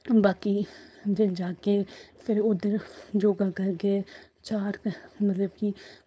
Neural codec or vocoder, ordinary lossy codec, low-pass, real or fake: codec, 16 kHz, 4.8 kbps, FACodec; none; none; fake